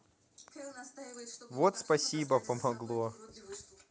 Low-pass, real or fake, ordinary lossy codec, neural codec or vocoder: none; real; none; none